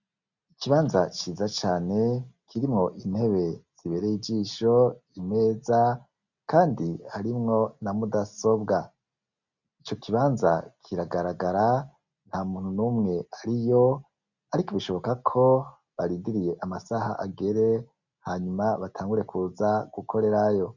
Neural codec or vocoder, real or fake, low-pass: none; real; 7.2 kHz